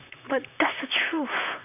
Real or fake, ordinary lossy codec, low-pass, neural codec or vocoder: fake; none; 3.6 kHz; vocoder, 44.1 kHz, 128 mel bands, Pupu-Vocoder